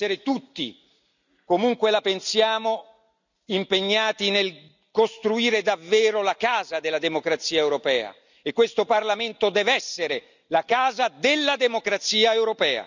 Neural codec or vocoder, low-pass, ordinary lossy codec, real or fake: none; 7.2 kHz; none; real